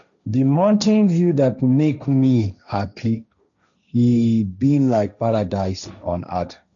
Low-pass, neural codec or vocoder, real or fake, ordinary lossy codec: 7.2 kHz; codec, 16 kHz, 1.1 kbps, Voila-Tokenizer; fake; none